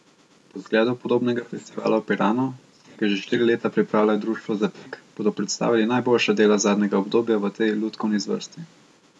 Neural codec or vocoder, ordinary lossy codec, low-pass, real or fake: none; none; none; real